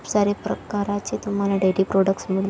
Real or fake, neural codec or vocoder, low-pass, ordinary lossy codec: real; none; none; none